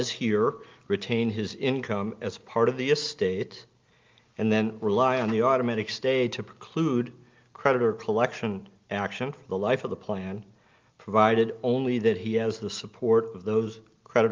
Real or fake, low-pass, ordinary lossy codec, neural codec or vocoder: real; 7.2 kHz; Opus, 24 kbps; none